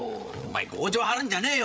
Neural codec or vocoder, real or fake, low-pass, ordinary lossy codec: codec, 16 kHz, 8 kbps, FreqCodec, larger model; fake; none; none